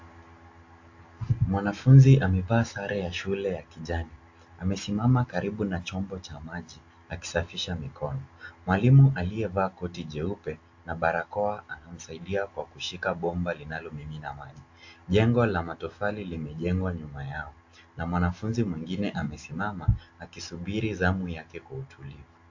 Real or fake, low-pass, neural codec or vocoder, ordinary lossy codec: real; 7.2 kHz; none; AAC, 48 kbps